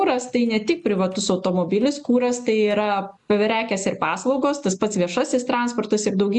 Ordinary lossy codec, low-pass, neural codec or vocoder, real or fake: MP3, 96 kbps; 10.8 kHz; none; real